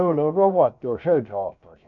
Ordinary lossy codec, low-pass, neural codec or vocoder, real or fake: none; 7.2 kHz; codec, 16 kHz, about 1 kbps, DyCAST, with the encoder's durations; fake